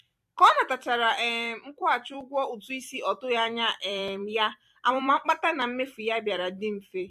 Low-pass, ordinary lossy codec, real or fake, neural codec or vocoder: 14.4 kHz; MP3, 64 kbps; fake; vocoder, 44.1 kHz, 128 mel bands every 256 samples, BigVGAN v2